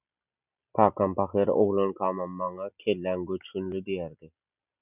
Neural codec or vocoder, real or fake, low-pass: none; real; 3.6 kHz